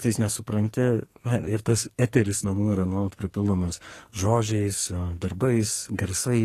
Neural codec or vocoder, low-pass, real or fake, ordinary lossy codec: codec, 44.1 kHz, 2.6 kbps, SNAC; 14.4 kHz; fake; AAC, 48 kbps